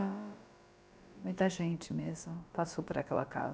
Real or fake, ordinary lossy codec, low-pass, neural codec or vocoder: fake; none; none; codec, 16 kHz, about 1 kbps, DyCAST, with the encoder's durations